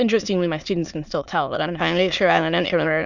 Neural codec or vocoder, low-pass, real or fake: autoencoder, 22.05 kHz, a latent of 192 numbers a frame, VITS, trained on many speakers; 7.2 kHz; fake